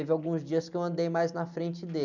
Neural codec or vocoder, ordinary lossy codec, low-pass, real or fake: none; none; 7.2 kHz; real